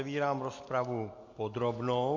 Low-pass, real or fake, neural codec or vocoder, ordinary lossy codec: 7.2 kHz; real; none; MP3, 48 kbps